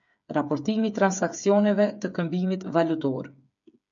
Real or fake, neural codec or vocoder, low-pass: fake; codec, 16 kHz, 8 kbps, FreqCodec, smaller model; 7.2 kHz